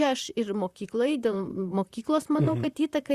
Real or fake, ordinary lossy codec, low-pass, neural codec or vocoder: fake; Opus, 64 kbps; 14.4 kHz; vocoder, 44.1 kHz, 128 mel bands, Pupu-Vocoder